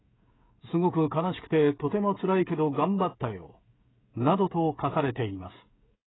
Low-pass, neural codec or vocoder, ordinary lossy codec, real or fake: 7.2 kHz; codec, 16 kHz, 16 kbps, FreqCodec, smaller model; AAC, 16 kbps; fake